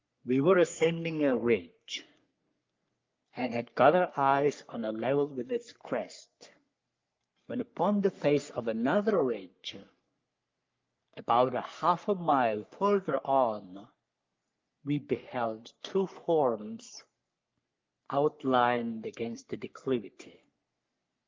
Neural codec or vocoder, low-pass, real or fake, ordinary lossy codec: codec, 44.1 kHz, 3.4 kbps, Pupu-Codec; 7.2 kHz; fake; Opus, 24 kbps